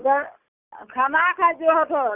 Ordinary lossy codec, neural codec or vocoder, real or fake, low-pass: none; none; real; 3.6 kHz